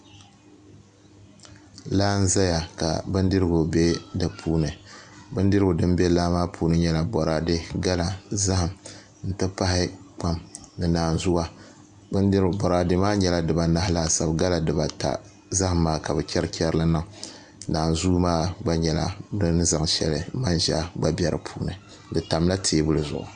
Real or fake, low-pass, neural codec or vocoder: real; 9.9 kHz; none